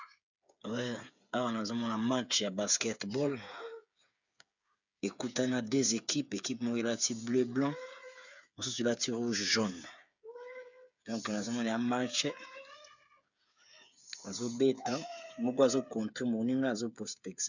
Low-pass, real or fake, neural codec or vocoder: 7.2 kHz; fake; codec, 16 kHz, 8 kbps, FreqCodec, smaller model